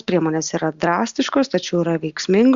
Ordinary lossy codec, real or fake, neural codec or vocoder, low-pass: Opus, 64 kbps; real; none; 7.2 kHz